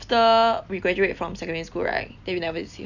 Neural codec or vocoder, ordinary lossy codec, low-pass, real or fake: none; none; 7.2 kHz; real